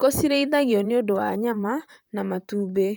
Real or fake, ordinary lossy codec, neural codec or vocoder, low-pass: fake; none; vocoder, 44.1 kHz, 128 mel bands, Pupu-Vocoder; none